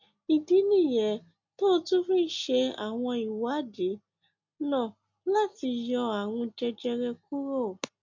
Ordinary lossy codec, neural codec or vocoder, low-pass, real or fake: MP3, 48 kbps; none; 7.2 kHz; real